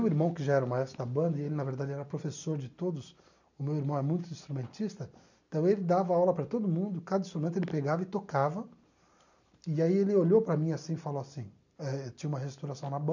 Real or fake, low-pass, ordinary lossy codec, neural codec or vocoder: real; 7.2 kHz; none; none